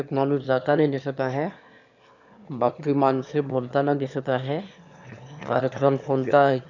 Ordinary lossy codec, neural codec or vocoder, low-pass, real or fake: none; autoencoder, 22.05 kHz, a latent of 192 numbers a frame, VITS, trained on one speaker; 7.2 kHz; fake